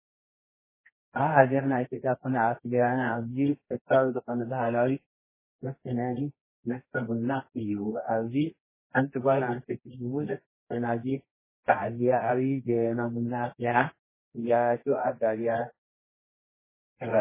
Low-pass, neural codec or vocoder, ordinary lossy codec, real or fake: 3.6 kHz; codec, 24 kHz, 0.9 kbps, WavTokenizer, medium music audio release; MP3, 16 kbps; fake